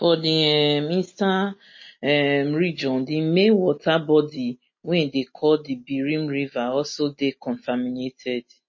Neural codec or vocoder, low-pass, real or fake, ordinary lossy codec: none; 7.2 kHz; real; MP3, 32 kbps